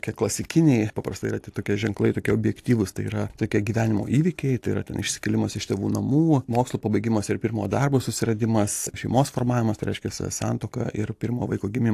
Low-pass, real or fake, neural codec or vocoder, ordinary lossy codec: 14.4 kHz; real; none; AAC, 64 kbps